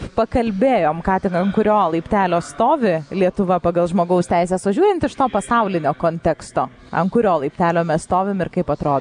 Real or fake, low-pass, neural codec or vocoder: real; 9.9 kHz; none